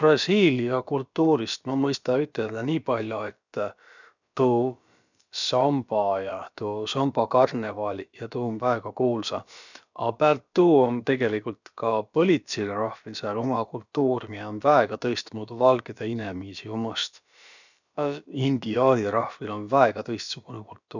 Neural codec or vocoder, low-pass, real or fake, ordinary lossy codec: codec, 16 kHz, about 1 kbps, DyCAST, with the encoder's durations; 7.2 kHz; fake; none